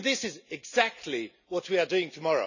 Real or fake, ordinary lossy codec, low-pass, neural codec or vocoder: real; none; 7.2 kHz; none